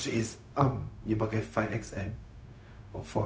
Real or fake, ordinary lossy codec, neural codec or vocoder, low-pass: fake; none; codec, 16 kHz, 0.4 kbps, LongCat-Audio-Codec; none